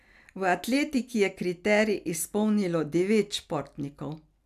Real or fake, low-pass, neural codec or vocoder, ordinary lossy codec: real; 14.4 kHz; none; none